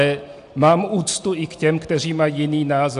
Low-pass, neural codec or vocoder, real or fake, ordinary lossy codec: 10.8 kHz; none; real; AAC, 64 kbps